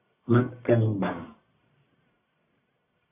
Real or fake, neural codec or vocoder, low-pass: fake; codec, 44.1 kHz, 1.7 kbps, Pupu-Codec; 3.6 kHz